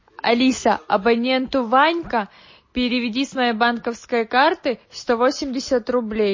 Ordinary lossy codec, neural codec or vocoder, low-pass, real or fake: MP3, 32 kbps; none; 7.2 kHz; real